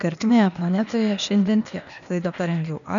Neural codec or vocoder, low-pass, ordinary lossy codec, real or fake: codec, 16 kHz, 0.8 kbps, ZipCodec; 7.2 kHz; MP3, 96 kbps; fake